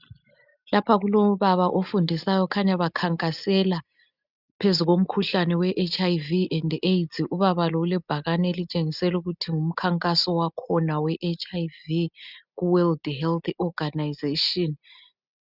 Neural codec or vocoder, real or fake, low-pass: none; real; 5.4 kHz